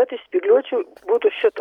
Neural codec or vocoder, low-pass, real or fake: none; 19.8 kHz; real